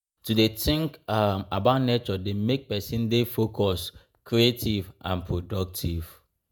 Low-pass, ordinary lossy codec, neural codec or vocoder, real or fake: none; none; vocoder, 48 kHz, 128 mel bands, Vocos; fake